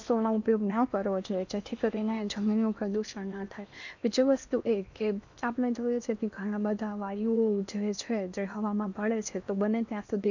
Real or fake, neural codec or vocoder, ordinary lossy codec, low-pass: fake; codec, 16 kHz in and 24 kHz out, 0.8 kbps, FocalCodec, streaming, 65536 codes; none; 7.2 kHz